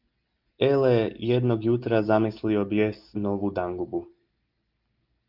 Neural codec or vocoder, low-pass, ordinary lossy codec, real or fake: none; 5.4 kHz; Opus, 32 kbps; real